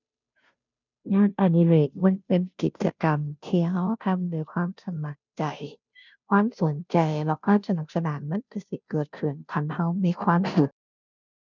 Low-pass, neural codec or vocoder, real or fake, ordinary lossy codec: 7.2 kHz; codec, 16 kHz, 0.5 kbps, FunCodec, trained on Chinese and English, 25 frames a second; fake; none